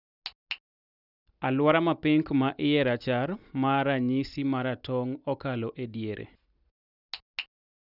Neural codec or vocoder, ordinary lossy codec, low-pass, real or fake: none; none; 5.4 kHz; real